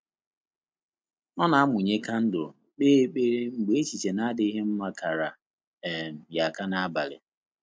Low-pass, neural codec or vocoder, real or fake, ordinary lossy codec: none; none; real; none